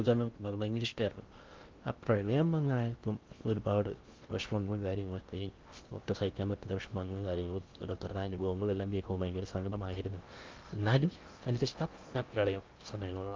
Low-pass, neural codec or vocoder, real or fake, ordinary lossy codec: 7.2 kHz; codec, 16 kHz in and 24 kHz out, 0.6 kbps, FocalCodec, streaming, 2048 codes; fake; Opus, 24 kbps